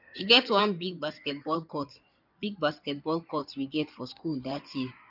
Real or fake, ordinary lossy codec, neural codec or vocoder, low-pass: fake; none; codec, 16 kHz in and 24 kHz out, 2.2 kbps, FireRedTTS-2 codec; 5.4 kHz